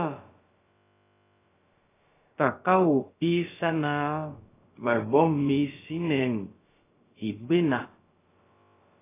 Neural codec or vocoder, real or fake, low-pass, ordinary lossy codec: codec, 16 kHz, about 1 kbps, DyCAST, with the encoder's durations; fake; 3.6 kHz; AAC, 16 kbps